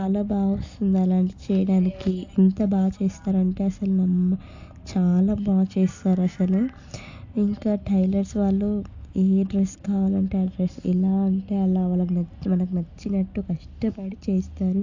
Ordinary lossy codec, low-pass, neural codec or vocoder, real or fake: AAC, 48 kbps; 7.2 kHz; none; real